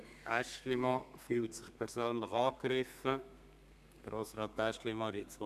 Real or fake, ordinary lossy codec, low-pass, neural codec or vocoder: fake; none; 14.4 kHz; codec, 32 kHz, 1.9 kbps, SNAC